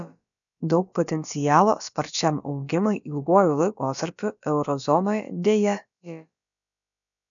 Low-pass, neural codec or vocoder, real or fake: 7.2 kHz; codec, 16 kHz, about 1 kbps, DyCAST, with the encoder's durations; fake